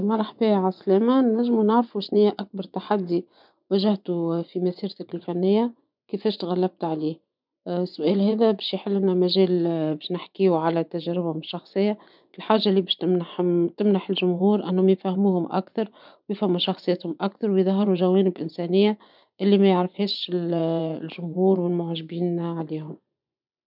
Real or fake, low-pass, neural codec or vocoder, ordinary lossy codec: fake; 5.4 kHz; vocoder, 24 kHz, 100 mel bands, Vocos; none